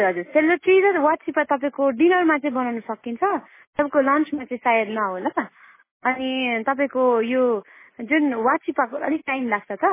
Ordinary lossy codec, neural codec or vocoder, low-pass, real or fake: MP3, 16 kbps; none; 3.6 kHz; real